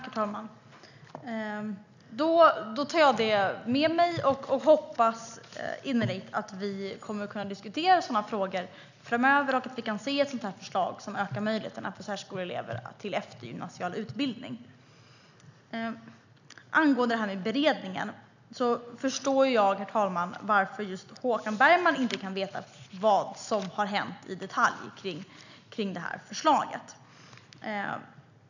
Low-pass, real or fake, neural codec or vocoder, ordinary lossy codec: 7.2 kHz; real; none; none